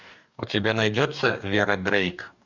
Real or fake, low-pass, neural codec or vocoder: fake; 7.2 kHz; codec, 44.1 kHz, 2.6 kbps, DAC